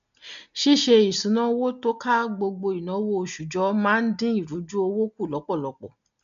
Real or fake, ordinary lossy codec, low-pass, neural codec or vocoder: real; none; 7.2 kHz; none